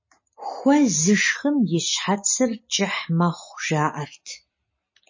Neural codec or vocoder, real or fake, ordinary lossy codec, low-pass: none; real; MP3, 32 kbps; 7.2 kHz